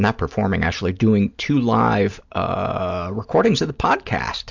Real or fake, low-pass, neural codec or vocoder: real; 7.2 kHz; none